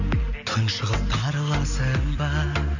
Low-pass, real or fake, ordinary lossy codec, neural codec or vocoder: 7.2 kHz; real; none; none